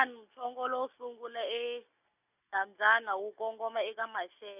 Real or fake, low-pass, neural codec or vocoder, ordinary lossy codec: real; 3.6 kHz; none; none